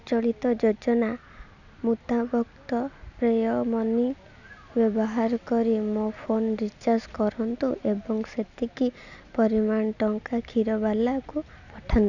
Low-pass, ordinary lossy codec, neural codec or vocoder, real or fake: 7.2 kHz; none; none; real